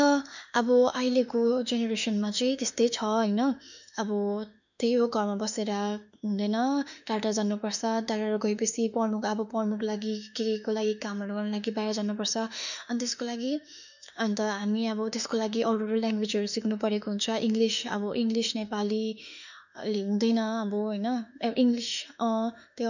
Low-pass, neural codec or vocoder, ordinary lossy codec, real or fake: 7.2 kHz; autoencoder, 48 kHz, 32 numbers a frame, DAC-VAE, trained on Japanese speech; none; fake